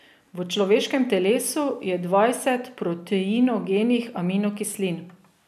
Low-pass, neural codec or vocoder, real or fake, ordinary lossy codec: 14.4 kHz; none; real; none